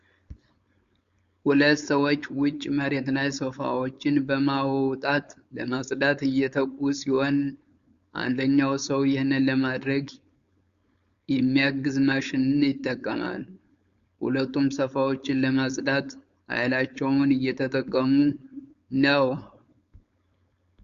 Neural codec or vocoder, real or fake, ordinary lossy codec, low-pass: codec, 16 kHz, 4.8 kbps, FACodec; fake; Opus, 64 kbps; 7.2 kHz